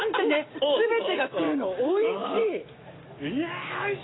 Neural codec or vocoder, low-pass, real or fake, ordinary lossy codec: codec, 16 kHz, 6 kbps, DAC; 7.2 kHz; fake; AAC, 16 kbps